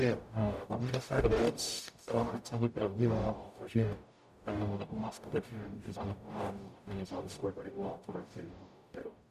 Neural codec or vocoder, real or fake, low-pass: codec, 44.1 kHz, 0.9 kbps, DAC; fake; 14.4 kHz